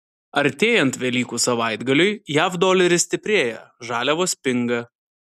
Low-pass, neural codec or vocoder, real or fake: 14.4 kHz; none; real